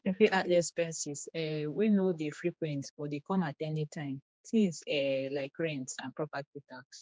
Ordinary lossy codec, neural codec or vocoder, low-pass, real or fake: none; codec, 16 kHz, 2 kbps, X-Codec, HuBERT features, trained on general audio; none; fake